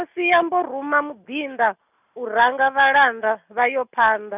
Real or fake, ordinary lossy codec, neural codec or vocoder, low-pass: real; none; none; 3.6 kHz